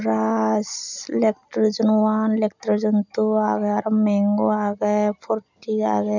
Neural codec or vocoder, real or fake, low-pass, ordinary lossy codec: none; real; 7.2 kHz; none